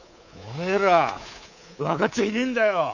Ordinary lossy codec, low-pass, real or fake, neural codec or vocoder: none; 7.2 kHz; fake; codec, 24 kHz, 3.1 kbps, DualCodec